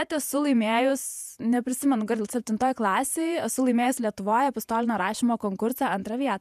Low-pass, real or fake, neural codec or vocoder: 14.4 kHz; fake; vocoder, 48 kHz, 128 mel bands, Vocos